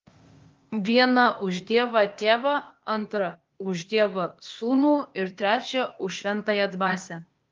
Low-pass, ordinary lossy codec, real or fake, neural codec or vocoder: 7.2 kHz; Opus, 32 kbps; fake; codec, 16 kHz, 0.8 kbps, ZipCodec